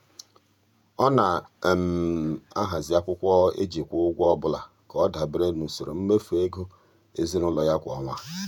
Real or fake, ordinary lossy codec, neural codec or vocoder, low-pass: fake; none; vocoder, 48 kHz, 128 mel bands, Vocos; 19.8 kHz